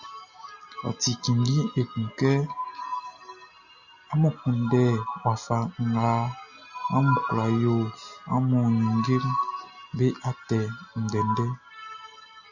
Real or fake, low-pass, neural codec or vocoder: real; 7.2 kHz; none